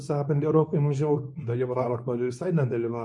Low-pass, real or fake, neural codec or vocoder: 10.8 kHz; fake; codec, 24 kHz, 0.9 kbps, WavTokenizer, medium speech release version 2